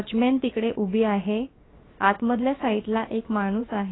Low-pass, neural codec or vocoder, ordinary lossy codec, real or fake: 7.2 kHz; codec, 16 kHz, about 1 kbps, DyCAST, with the encoder's durations; AAC, 16 kbps; fake